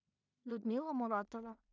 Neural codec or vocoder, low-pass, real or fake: codec, 16 kHz in and 24 kHz out, 0.4 kbps, LongCat-Audio-Codec, two codebook decoder; 7.2 kHz; fake